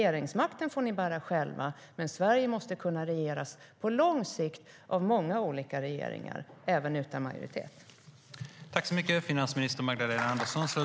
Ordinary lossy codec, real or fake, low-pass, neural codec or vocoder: none; real; none; none